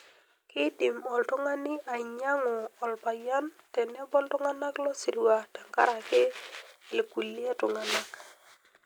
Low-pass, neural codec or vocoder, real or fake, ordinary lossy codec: none; none; real; none